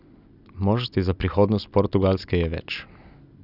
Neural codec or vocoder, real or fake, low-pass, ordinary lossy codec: none; real; 5.4 kHz; none